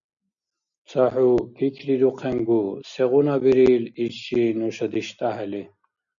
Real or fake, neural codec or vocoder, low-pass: real; none; 7.2 kHz